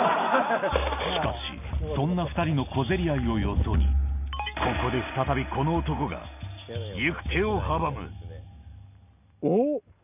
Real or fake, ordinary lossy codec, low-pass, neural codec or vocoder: real; none; 3.6 kHz; none